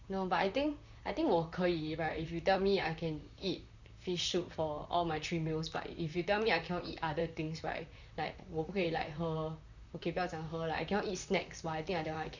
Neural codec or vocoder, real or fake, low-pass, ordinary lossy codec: vocoder, 44.1 kHz, 128 mel bands, Pupu-Vocoder; fake; 7.2 kHz; none